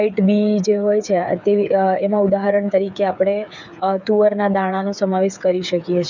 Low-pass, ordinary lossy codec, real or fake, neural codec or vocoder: 7.2 kHz; none; fake; codec, 16 kHz, 8 kbps, FreqCodec, smaller model